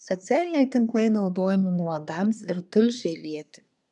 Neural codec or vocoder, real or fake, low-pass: codec, 24 kHz, 1 kbps, SNAC; fake; 10.8 kHz